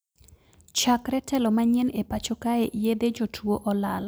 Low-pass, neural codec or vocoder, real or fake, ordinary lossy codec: none; none; real; none